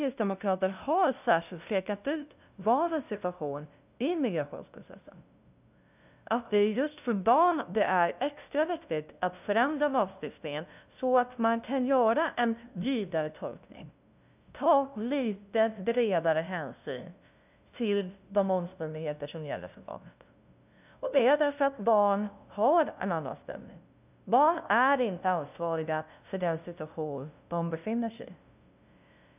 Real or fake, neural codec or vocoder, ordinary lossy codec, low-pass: fake; codec, 16 kHz, 0.5 kbps, FunCodec, trained on LibriTTS, 25 frames a second; none; 3.6 kHz